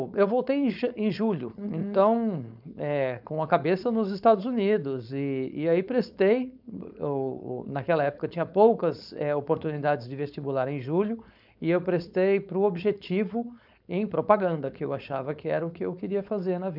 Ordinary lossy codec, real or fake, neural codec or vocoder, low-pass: none; fake; codec, 16 kHz, 4.8 kbps, FACodec; 5.4 kHz